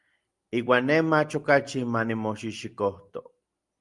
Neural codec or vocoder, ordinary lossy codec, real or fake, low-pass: none; Opus, 32 kbps; real; 10.8 kHz